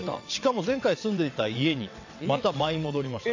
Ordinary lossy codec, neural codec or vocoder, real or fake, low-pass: AAC, 48 kbps; vocoder, 22.05 kHz, 80 mel bands, WaveNeXt; fake; 7.2 kHz